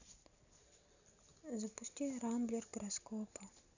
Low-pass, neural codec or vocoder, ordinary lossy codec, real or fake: 7.2 kHz; none; none; real